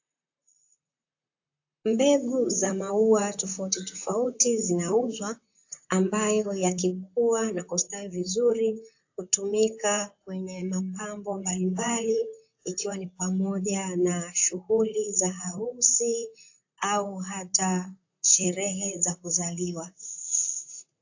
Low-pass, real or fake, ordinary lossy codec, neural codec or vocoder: 7.2 kHz; fake; AAC, 48 kbps; vocoder, 44.1 kHz, 128 mel bands, Pupu-Vocoder